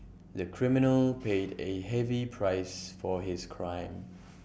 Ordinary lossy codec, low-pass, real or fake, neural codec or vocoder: none; none; real; none